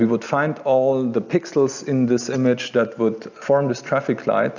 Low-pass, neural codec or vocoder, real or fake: 7.2 kHz; none; real